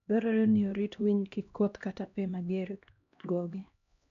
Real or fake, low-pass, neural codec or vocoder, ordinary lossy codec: fake; 7.2 kHz; codec, 16 kHz, 1 kbps, X-Codec, HuBERT features, trained on LibriSpeech; none